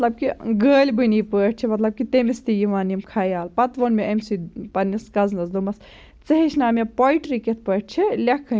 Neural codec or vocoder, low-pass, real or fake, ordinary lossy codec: none; none; real; none